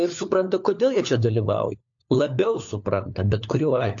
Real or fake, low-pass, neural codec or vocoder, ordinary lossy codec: fake; 7.2 kHz; codec, 16 kHz, 4 kbps, FunCodec, trained on LibriTTS, 50 frames a second; AAC, 64 kbps